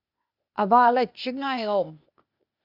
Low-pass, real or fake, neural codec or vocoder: 5.4 kHz; fake; codec, 16 kHz, 0.8 kbps, ZipCodec